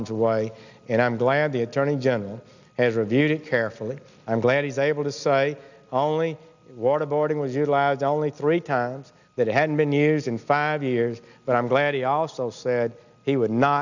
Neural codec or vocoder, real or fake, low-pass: none; real; 7.2 kHz